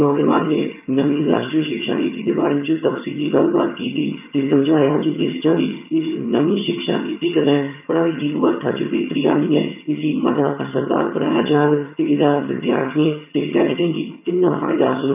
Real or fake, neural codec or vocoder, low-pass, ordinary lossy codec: fake; vocoder, 22.05 kHz, 80 mel bands, HiFi-GAN; 3.6 kHz; none